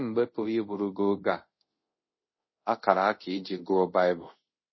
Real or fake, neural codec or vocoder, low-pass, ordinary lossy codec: fake; codec, 24 kHz, 0.5 kbps, DualCodec; 7.2 kHz; MP3, 24 kbps